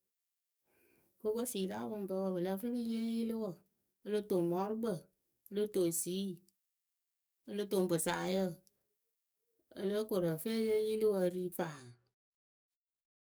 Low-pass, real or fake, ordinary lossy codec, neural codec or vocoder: none; fake; none; codec, 44.1 kHz, 7.8 kbps, DAC